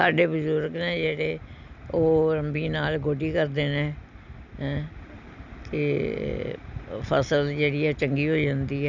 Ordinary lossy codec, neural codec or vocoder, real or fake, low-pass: none; none; real; 7.2 kHz